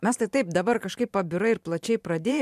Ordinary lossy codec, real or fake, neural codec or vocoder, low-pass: MP3, 96 kbps; fake; vocoder, 44.1 kHz, 128 mel bands every 512 samples, BigVGAN v2; 14.4 kHz